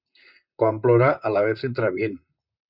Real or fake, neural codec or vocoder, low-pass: fake; vocoder, 44.1 kHz, 128 mel bands, Pupu-Vocoder; 5.4 kHz